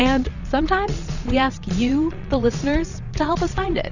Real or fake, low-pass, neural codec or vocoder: fake; 7.2 kHz; vocoder, 22.05 kHz, 80 mel bands, Vocos